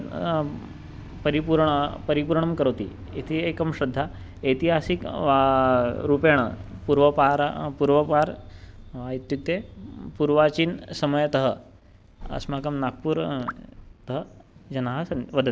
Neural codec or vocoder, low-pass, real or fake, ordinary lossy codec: none; none; real; none